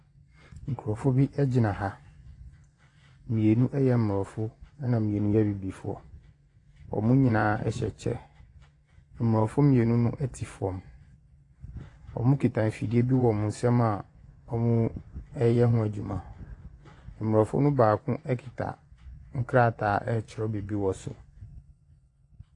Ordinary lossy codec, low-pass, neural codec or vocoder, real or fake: AAC, 48 kbps; 10.8 kHz; vocoder, 24 kHz, 100 mel bands, Vocos; fake